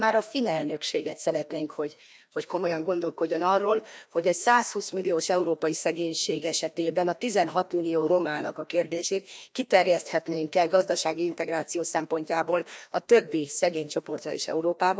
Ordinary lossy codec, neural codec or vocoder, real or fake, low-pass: none; codec, 16 kHz, 1 kbps, FreqCodec, larger model; fake; none